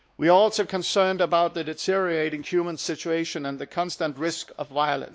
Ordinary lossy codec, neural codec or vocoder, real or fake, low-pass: none; codec, 16 kHz, 2 kbps, X-Codec, WavLM features, trained on Multilingual LibriSpeech; fake; none